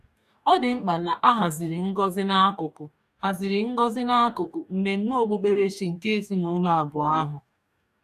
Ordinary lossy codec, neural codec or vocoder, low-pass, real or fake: none; codec, 44.1 kHz, 2.6 kbps, DAC; 14.4 kHz; fake